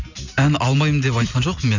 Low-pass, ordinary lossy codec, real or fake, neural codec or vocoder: 7.2 kHz; none; real; none